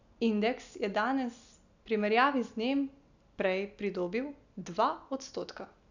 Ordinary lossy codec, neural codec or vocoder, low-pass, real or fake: none; none; 7.2 kHz; real